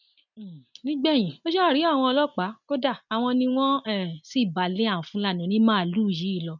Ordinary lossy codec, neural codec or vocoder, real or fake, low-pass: none; none; real; 7.2 kHz